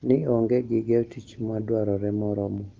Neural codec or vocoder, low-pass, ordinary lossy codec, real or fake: none; 7.2 kHz; Opus, 32 kbps; real